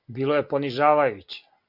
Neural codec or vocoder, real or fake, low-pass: none; real; 5.4 kHz